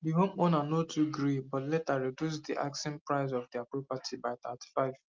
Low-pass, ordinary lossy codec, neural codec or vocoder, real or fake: 7.2 kHz; Opus, 32 kbps; none; real